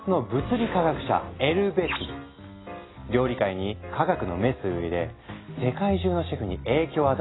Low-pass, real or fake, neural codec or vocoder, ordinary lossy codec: 7.2 kHz; real; none; AAC, 16 kbps